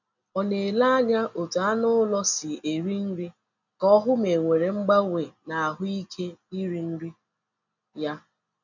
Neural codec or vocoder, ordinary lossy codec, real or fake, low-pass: none; none; real; 7.2 kHz